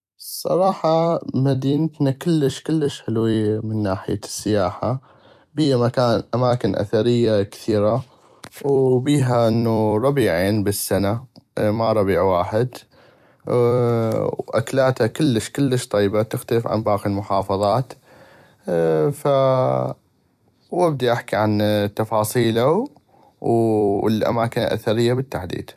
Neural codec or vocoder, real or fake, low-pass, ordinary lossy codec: vocoder, 44.1 kHz, 128 mel bands every 256 samples, BigVGAN v2; fake; 14.4 kHz; none